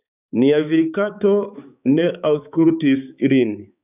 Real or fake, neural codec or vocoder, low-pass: fake; codec, 16 kHz, 4 kbps, X-Codec, HuBERT features, trained on balanced general audio; 3.6 kHz